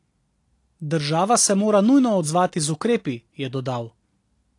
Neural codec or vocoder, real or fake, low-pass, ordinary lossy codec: none; real; 10.8 kHz; AAC, 48 kbps